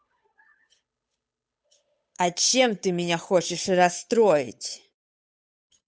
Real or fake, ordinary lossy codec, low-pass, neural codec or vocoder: fake; none; none; codec, 16 kHz, 8 kbps, FunCodec, trained on Chinese and English, 25 frames a second